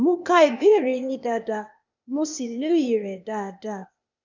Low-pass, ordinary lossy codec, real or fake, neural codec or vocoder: 7.2 kHz; none; fake; codec, 16 kHz, 0.8 kbps, ZipCodec